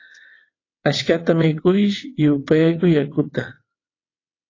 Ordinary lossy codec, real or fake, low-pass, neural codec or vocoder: AAC, 32 kbps; fake; 7.2 kHz; vocoder, 22.05 kHz, 80 mel bands, WaveNeXt